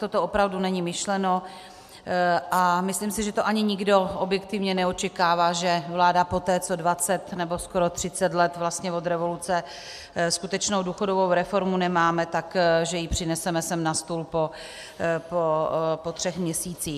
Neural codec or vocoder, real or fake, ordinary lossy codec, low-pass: none; real; MP3, 96 kbps; 14.4 kHz